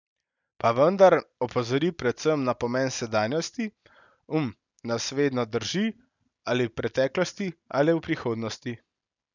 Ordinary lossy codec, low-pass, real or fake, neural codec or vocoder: none; 7.2 kHz; real; none